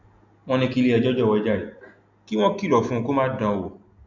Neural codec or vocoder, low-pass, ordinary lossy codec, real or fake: none; 7.2 kHz; none; real